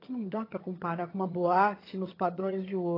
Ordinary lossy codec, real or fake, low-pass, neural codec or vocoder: AAC, 24 kbps; fake; 5.4 kHz; vocoder, 22.05 kHz, 80 mel bands, HiFi-GAN